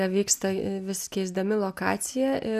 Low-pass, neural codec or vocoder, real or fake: 14.4 kHz; none; real